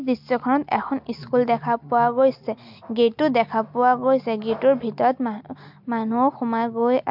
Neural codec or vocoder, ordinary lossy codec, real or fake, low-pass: none; MP3, 48 kbps; real; 5.4 kHz